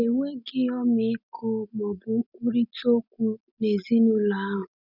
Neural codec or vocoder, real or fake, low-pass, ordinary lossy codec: none; real; 5.4 kHz; none